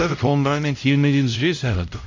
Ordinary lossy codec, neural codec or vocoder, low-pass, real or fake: AAC, 48 kbps; codec, 16 kHz, 0.5 kbps, X-Codec, HuBERT features, trained on LibriSpeech; 7.2 kHz; fake